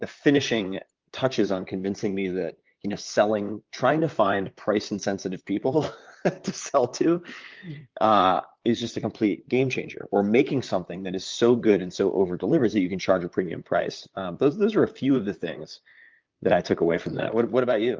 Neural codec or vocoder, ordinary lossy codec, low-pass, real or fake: vocoder, 44.1 kHz, 128 mel bands, Pupu-Vocoder; Opus, 24 kbps; 7.2 kHz; fake